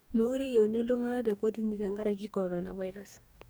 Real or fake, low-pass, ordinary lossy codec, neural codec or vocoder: fake; none; none; codec, 44.1 kHz, 2.6 kbps, DAC